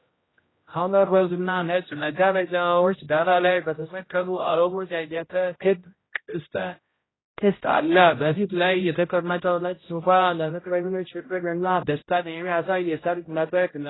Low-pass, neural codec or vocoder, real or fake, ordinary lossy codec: 7.2 kHz; codec, 16 kHz, 0.5 kbps, X-Codec, HuBERT features, trained on general audio; fake; AAC, 16 kbps